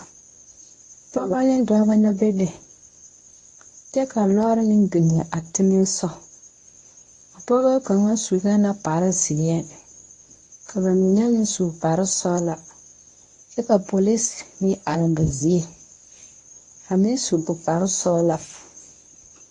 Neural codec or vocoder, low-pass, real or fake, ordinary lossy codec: codec, 24 kHz, 0.9 kbps, WavTokenizer, medium speech release version 1; 10.8 kHz; fake; AAC, 48 kbps